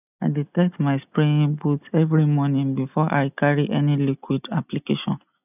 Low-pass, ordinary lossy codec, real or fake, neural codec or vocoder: 3.6 kHz; none; real; none